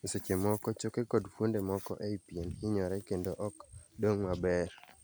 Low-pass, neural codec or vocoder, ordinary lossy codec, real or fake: none; none; none; real